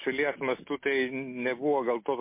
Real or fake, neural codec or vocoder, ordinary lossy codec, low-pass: real; none; MP3, 24 kbps; 3.6 kHz